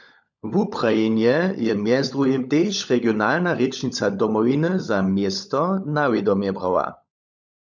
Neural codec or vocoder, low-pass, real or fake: codec, 16 kHz, 16 kbps, FunCodec, trained on LibriTTS, 50 frames a second; 7.2 kHz; fake